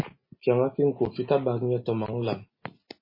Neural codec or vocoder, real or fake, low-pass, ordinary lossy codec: none; real; 5.4 kHz; MP3, 24 kbps